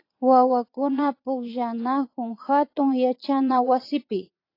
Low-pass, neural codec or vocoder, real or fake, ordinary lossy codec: 5.4 kHz; vocoder, 22.05 kHz, 80 mel bands, Vocos; fake; AAC, 32 kbps